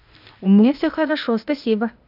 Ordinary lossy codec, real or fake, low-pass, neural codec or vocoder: AAC, 48 kbps; fake; 5.4 kHz; codec, 16 kHz, 0.8 kbps, ZipCodec